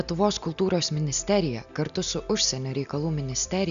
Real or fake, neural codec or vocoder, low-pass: real; none; 7.2 kHz